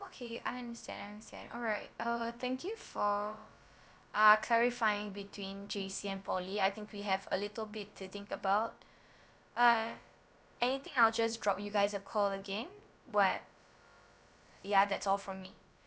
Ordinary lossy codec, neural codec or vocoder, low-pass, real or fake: none; codec, 16 kHz, about 1 kbps, DyCAST, with the encoder's durations; none; fake